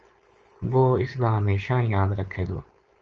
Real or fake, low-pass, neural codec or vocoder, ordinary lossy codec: fake; 7.2 kHz; codec, 16 kHz, 4.8 kbps, FACodec; Opus, 24 kbps